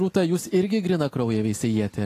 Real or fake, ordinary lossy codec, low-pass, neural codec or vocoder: real; AAC, 48 kbps; 14.4 kHz; none